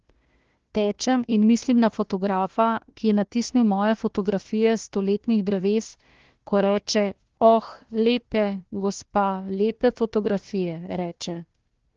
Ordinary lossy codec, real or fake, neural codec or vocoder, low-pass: Opus, 16 kbps; fake; codec, 16 kHz, 1 kbps, FunCodec, trained on Chinese and English, 50 frames a second; 7.2 kHz